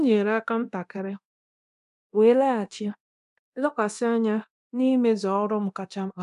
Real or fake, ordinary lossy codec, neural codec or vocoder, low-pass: fake; none; codec, 24 kHz, 0.9 kbps, DualCodec; 10.8 kHz